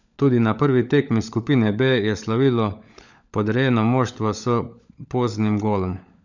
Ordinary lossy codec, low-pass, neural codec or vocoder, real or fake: none; 7.2 kHz; codec, 16 kHz, 16 kbps, FunCodec, trained on LibriTTS, 50 frames a second; fake